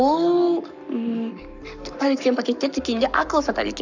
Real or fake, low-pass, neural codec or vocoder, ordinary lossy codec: fake; 7.2 kHz; codec, 44.1 kHz, 7.8 kbps, Pupu-Codec; none